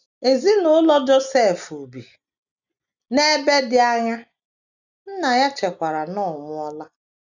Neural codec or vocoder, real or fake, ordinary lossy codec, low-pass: none; real; none; 7.2 kHz